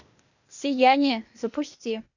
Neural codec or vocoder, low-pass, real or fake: codec, 16 kHz, 0.8 kbps, ZipCodec; 7.2 kHz; fake